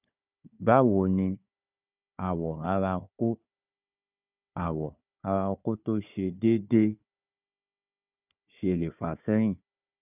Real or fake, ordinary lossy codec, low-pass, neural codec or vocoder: fake; none; 3.6 kHz; codec, 16 kHz, 4 kbps, FunCodec, trained on Chinese and English, 50 frames a second